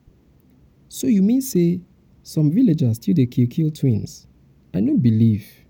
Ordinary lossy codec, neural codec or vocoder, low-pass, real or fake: none; none; 19.8 kHz; real